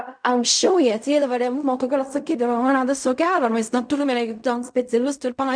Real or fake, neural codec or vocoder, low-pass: fake; codec, 16 kHz in and 24 kHz out, 0.4 kbps, LongCat-Audio-Codec, fine tuned four codebook decoder; 9.9 kHz